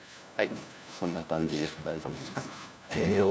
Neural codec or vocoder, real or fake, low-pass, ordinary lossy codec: codec, 16 kHz, 1 kbps, FunCodec, trained on LibriTTS, 50 frames a second; fake; none; none